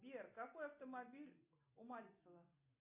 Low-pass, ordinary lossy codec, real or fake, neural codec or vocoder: 3.6 kHz; MP3, 24 kbps; real; none